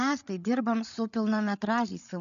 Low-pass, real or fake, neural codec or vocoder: 7.2 kHz; fake; codec, 16 kHz, 8 kbps, FreqCodec, larger model